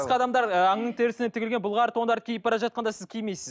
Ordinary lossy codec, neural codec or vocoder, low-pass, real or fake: none; none; none; real